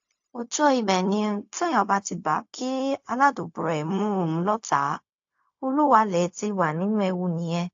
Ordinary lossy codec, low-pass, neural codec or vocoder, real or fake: AAC, 48 kbps; 7.2 kHz; codec, 16 kHz, 0.4 kbps, LongCat-Audio-Codec; fake